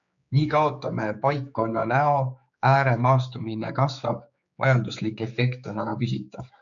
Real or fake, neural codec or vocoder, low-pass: fake; codec, 16 kHz, 4 kbps, X-Codec, HuBERT features, trained on general audio; 7.2 kHz